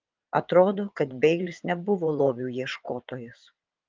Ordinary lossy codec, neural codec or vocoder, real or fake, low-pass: Opus, 24 kbps; vocoder, 22.05 kHz, 80 mel bands, WaveNeXt; fake; 7.2 kHz